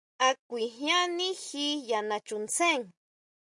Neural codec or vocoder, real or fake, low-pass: none; real; 10.8 kHz